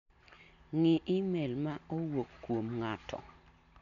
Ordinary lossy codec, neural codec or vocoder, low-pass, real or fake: none; none; 7.2 kHz; real